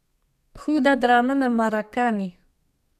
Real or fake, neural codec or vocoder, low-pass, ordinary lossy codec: fake; codec, 32 kHz, 1.9 kbps, SNAC; 14.4 kHz; none